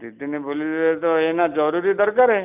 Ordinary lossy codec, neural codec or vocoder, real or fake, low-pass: none; none; real; 3.6 kHz